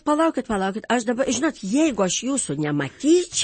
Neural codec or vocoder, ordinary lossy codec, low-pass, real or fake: none; MP3, 32 kbps; 10.8 kHz; real